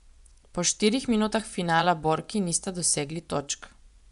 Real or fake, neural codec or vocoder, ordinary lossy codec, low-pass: fake; vocoder, 24 kHz, 100 mel bands, Vocos; none; 10.8 kHz